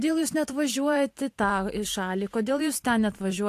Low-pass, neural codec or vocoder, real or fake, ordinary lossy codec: 14.4 kHz; vocoder, 44.1 kHz, 128 mel bands every 512 samples, BigVGAN v2; fake; AAC, 64 kbps